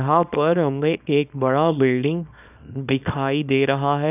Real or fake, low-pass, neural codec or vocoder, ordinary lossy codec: fake; 3.6 kHz; codec, 24 kHz, 0.9 kbps, WavTokenizer, small release; none